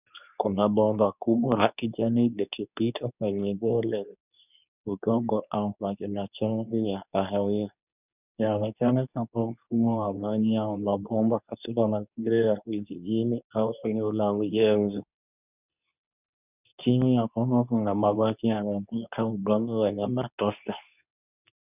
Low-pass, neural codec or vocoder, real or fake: 3.6 kHz; codec, 24 kHz, 0.9 kbps, WavTokenizer, medium speech release version 2; fake